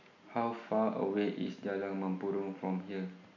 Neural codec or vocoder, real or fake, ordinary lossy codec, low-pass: none; real; none; 7.2 kHz